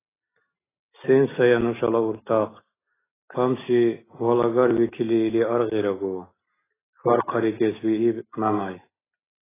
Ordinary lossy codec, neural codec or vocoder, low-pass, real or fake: AAC, 16 kbps; none; 3.6 kHz; real